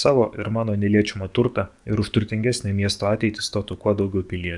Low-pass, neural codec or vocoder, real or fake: 10.8 kHz; codec, 44.1 kHz, 7.8 kbps, DAC; fake